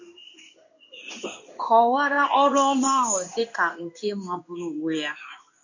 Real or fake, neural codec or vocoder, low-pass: fake; codec, 16 kHz in and 24 kHz out, 1 kbps, XY-Tokenizer; 7.2 kHz